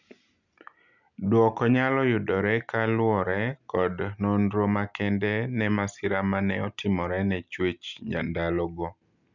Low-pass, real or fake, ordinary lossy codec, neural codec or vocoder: 7.2 kHz; real; none; none